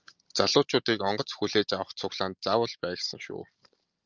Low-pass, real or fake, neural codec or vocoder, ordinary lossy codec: 7.2 kHz; real; none; Opus, 32 kbps